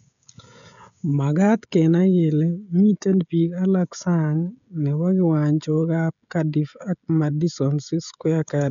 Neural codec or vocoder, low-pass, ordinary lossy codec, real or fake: codec, 16 kHz, 16 kbps, FreqCodec, smaller model; 7.2 kHz; none; fake